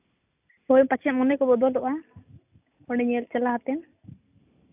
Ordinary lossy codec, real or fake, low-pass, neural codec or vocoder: none; real; 3.6 kHz; none